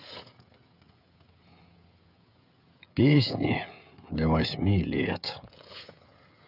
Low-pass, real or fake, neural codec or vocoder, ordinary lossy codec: 5.4 kHz; fake; codec, 16 kHz, 16 kbps, FreqCodec, smaller model; none